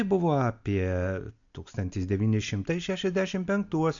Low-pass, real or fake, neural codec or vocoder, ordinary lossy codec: 7.2 kHz; real; none; AAC, 64 kbps